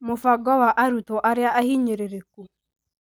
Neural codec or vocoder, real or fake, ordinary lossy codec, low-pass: none; real; none; none